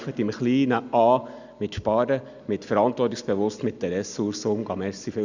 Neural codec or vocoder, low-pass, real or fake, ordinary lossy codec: none; 7.2 kHz; real; none